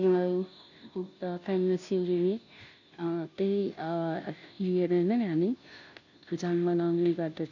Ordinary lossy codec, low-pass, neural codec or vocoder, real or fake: none; 7.2 kHz; codec, 16 kHz, 0.5 kbps, FunCodec, trained on Chinese and English, 25 frames a second; fake